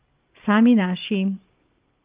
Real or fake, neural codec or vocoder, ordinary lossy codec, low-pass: fake; vocoder, 44.1 kHz, 128 mel bands every 512 samples, BigVGAN v2; Opus, 24 kbps; 3.6 kHz